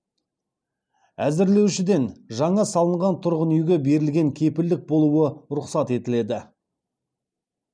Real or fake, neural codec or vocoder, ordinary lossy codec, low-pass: real; none; none; 9.9 kHz